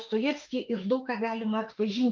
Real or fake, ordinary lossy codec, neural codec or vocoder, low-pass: fake; Opus, 24 kbps; autoencoder, 48 kHz, 32 numbers a frame, DAC-VAE, trained on Japanese speech; 7.2 kHz